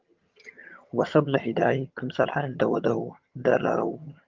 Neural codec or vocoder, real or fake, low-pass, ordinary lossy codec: vocoder, 22.05 kHz, 80 mel bands, HiFi-GAN; fake; 7.2 kHz; Opus, 32 kbps